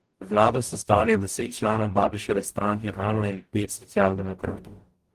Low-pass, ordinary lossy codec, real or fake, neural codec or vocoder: 14.4 kHz; Opus, 24 kbps; fake; codec, 44.1 kHz, 0.9 kbps, DAC